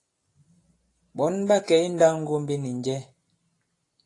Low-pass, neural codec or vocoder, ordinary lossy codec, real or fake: 10.8 kHz; none; AAC, 48 kbps; real